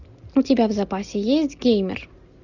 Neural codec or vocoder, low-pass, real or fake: none; 7.2 kHz; real